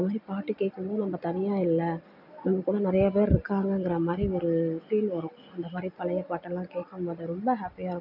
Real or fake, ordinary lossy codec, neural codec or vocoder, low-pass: real; none; none; 5.4 kHz